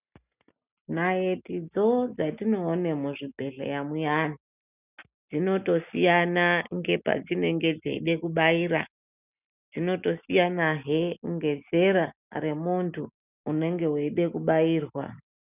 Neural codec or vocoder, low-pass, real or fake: none; 3.6 kHz; real